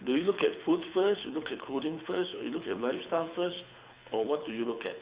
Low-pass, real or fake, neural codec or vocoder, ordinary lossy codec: 3.6 kHz; fake; codec, 24 kHz, 6 kbps, HILCodec; Opus, 64 kbps